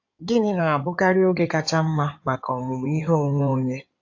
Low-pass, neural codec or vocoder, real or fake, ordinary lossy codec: 7.2 kHz; codec, 16 kHz in and 24 kHz out, 2.2 kbps, FireRedTTS-2 codec; fake; AAC, 48 kbps